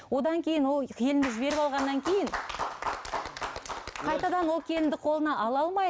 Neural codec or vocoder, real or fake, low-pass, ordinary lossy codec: none; real; none; none